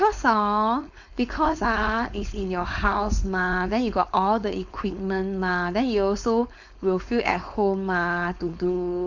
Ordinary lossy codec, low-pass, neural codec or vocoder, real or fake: none; 7.2 kHz; codec, 16 kHz, 4.8 kbps, FACodec; fake